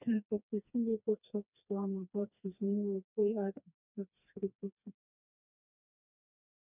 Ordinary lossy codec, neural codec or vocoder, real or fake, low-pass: none; codec, 16 kHz, 2 kbps, FreqCodec, smaller model; fake; 3.6 kHz